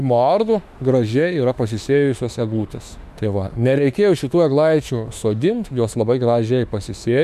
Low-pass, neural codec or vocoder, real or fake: 14.4 kHz; autoencoder, 48 kHz, 32 numbers a frame, DAC-VAE, trained on Japanese speech; fake